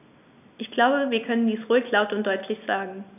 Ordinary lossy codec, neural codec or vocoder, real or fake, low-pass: none; none; real; 3.6 kHz